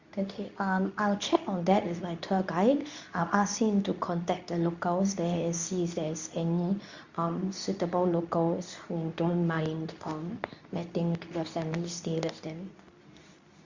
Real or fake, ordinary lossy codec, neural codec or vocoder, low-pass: fake; Opus, 64 kbps; codec, 24 kHz, 0.9 kbps, WavTokenizer, medium speech release version 2; 7.2 kHz